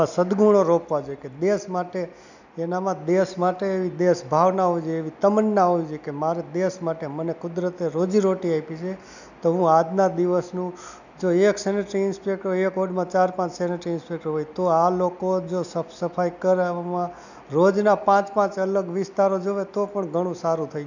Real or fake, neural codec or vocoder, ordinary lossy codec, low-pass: real; none; none; 7.2 kHz